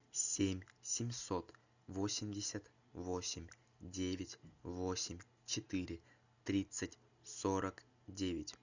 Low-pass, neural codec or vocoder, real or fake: 7.2 kHz; none; real